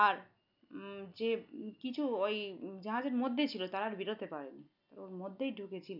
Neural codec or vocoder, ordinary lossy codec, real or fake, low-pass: none; none; real; 5.4 kHz